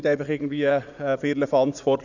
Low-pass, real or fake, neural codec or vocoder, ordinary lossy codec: 7.2 kHz; fake; vocoder, 44.1 kHz, 80 mel bands, Vocos; none